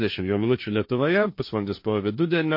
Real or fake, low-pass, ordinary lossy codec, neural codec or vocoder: fake; 5.4 kHz; MP3, 32 kbps; codec, 16 kHz, 1.1 kbps, Voila-Tokenizer